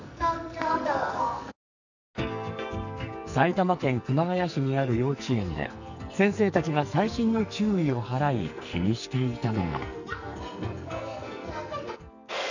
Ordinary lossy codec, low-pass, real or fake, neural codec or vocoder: none; 7.2 kHz; fake; codec, 44.1 kHz, 2.6 kbps, SNAC